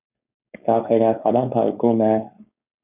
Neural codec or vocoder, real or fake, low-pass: codec, 16 kHz, 4.8 kbps, FACodec; fake; 3.6 kHz